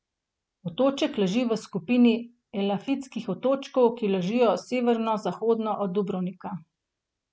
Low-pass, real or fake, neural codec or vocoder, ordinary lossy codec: none; real; none; none